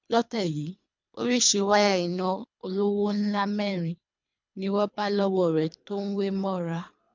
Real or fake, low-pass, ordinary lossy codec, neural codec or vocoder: fake; 7.2 kHz; MP3, 64 kbps; codec, 24 kHz, 3 kbps, HILCodec